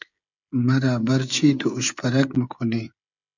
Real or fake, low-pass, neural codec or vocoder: fake; 7.2 kHz; codec, 16 kHz, 16 kbps, FreqCodec, smaller model